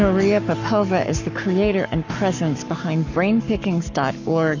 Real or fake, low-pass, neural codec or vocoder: fake; 7.2 kHz; codec, 44.1 kHz, 7.8 kbps, Pupu-Codec